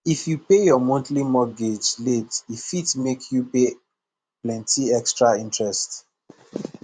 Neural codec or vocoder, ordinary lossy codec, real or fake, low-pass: vocoder, 24 kHz, 100 mel bands, Vocos; none; fake; 9.9 kHz